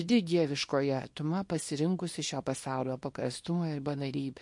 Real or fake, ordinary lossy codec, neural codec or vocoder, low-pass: fake; MP3, 48 kbps; codec, 24 kHz, 0.9 kbps, WavTokenizer, small release; 10.8 kHz